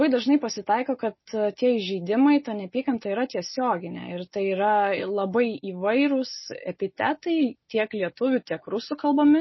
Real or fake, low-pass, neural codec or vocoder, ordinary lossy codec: real; 7.2 kHz; none; MP3, 24 kbps